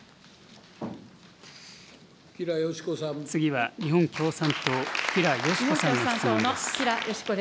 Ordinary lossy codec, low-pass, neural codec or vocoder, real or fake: none; none; none; real